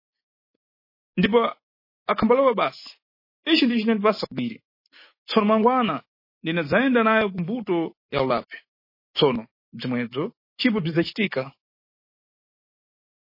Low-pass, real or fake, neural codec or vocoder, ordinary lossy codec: 5.4 kHz; real; none; MP3, 24 kbps